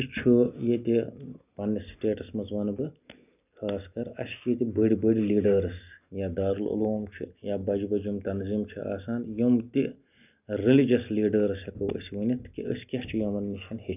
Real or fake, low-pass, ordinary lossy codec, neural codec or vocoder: real; 3.6 kHz; none; none